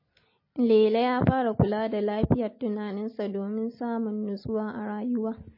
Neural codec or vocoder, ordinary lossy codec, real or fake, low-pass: none; MP3, 24 kbps; real; 5.4 kHz